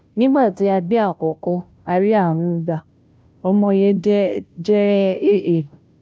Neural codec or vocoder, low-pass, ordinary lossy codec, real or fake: codec, 16 kHz, 0.5 kbps, FunCodec, trained on Chinese and English, 25 frames a second; none; none; fake